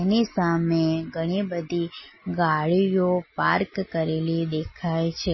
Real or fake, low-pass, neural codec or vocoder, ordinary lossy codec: real; 7.2 kHz; none; MP3, 24 kbps